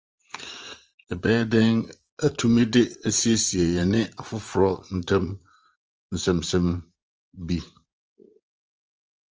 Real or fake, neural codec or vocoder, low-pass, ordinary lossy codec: fake; vocoder, 44.1 kHz, 80 mel bands, Vocos; 7.2 kHz; Opus, 24 kbps